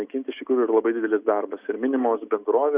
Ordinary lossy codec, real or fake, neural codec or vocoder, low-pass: Opus, 64 kbps; real; none; 3.6 kHz